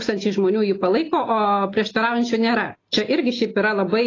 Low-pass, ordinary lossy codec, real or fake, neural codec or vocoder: 7.2 kHz; AAC, 32 kbps; real; none